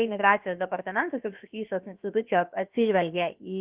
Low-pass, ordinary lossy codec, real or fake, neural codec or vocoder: 3.6 kHz; Opus, 32 kbps; fake; codec, 16 kHz, about 1 kbps, DyCAST, with the encoder's durations